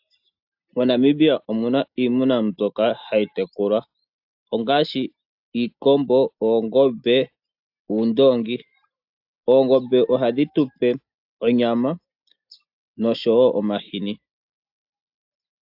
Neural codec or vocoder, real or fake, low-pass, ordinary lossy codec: none; real; 5.4 kHz; AAC, 48 kbps